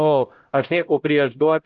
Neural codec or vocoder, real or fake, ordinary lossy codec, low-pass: codec, 16 kHz, 0.5 kbps, X-Codec, HuBERT features, trained on LibriSpeech; fake; Opus, 16 kbps; 7.2 kHz